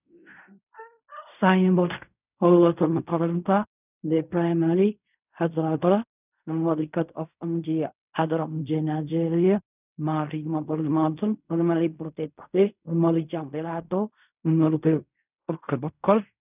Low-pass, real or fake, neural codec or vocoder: 3.6 kHz; fake; codec, 16 kHz in and 24 kHz out, 0.4 kbps, LongCat-Audio-Codec, fine tuned four codebook decoder